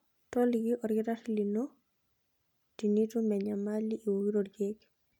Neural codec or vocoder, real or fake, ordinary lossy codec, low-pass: none; real; none; 19.8 kHz